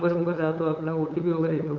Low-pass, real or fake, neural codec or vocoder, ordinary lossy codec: 7.2 kHz; fake; codec, 16 kHz, 8 kbps, FunCodec, trained on LibriTTS, 25 frames a second; none